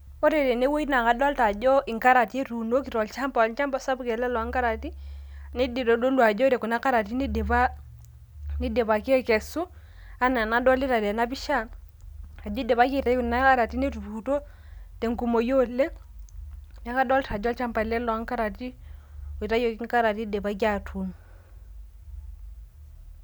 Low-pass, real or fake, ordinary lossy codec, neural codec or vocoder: none; real; none; none